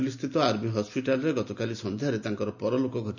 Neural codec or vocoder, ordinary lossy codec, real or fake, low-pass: none; none; real; 7.2 kHz